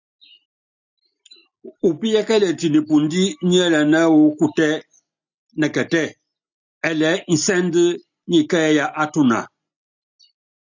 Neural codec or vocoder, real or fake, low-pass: none; real; 7.2 kHz